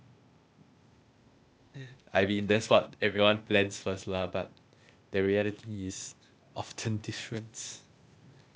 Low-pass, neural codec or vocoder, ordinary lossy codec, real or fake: none; codec, 16 kHz, 0.8 kbps, ZipCodec; none; fake